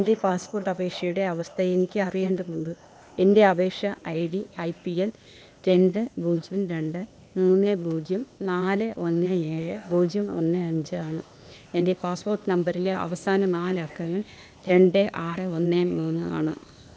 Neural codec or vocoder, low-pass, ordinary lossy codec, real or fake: codec, 16 kHz, 0.8 kbps, ZipCodec; none; none; fake